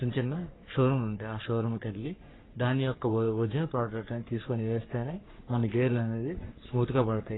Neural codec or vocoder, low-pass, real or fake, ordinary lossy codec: codec, 44.1 kHz, 3.4 kbps, Pupu-Codec; 7.2 kHz; fake; AAC, 16 kbps